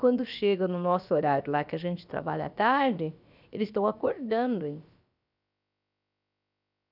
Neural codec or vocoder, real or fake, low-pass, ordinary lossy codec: codec, 16 kHz, about 1 kbps, DyCAST, with the encoder's durations; fake; 5.4 kHz; none